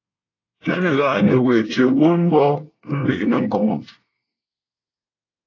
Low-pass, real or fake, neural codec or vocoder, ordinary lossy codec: 7.2 kHz; fake; codec, 24 kHz, 1 kbps, SNAC; AAC, 32 kbps